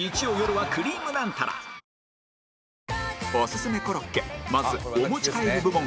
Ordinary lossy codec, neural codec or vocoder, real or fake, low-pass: none; none; real; none